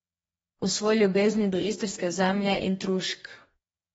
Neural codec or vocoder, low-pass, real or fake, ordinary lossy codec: codec, 44.1 kHz, 2.6 kbps, DAC; 19.8 kHz; fake; AAC, 24 kbps